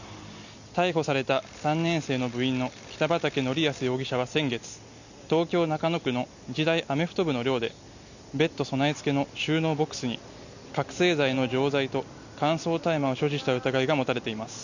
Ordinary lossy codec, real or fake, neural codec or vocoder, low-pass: none; real; none; 7.2 kHz